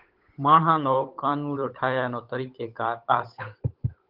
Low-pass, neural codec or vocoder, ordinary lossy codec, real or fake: 5.4 kHz; codec, 16 kHz, 8 kbps, FunCodec, trained on Chinese and English, 25 frames a second; Opus, 24 kbps; fake